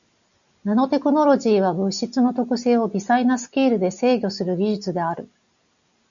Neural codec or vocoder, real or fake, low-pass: none; real; 7.2 kHz